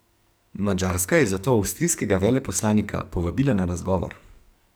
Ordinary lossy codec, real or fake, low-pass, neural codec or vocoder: none; fake; none; codec, 44.1 kHz, 2.6 kbps, SNAC